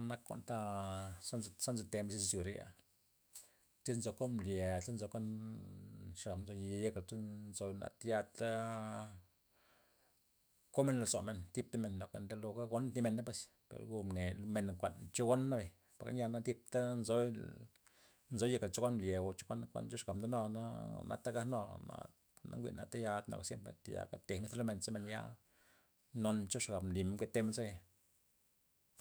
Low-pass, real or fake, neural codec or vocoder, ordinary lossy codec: none; fake; autoencoder, 48 kHz, 128 numbers a frame, DAC-VAE, trained on Japanese speech; none